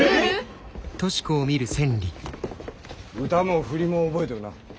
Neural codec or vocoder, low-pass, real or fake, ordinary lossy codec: none; none; real; none